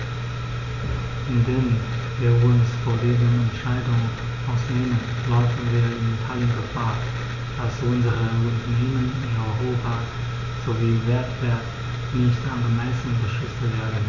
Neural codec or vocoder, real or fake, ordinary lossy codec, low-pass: none; real; none; 7.2 kHz